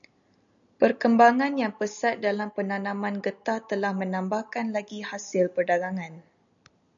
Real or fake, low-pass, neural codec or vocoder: real; 7.2 kHz; none